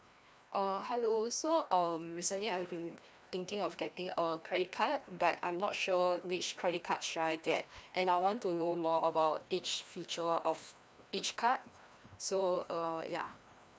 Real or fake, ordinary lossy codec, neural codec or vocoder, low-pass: fake; none; codec, 16 kHz, 1 kbps, FreqCodec, larger model; none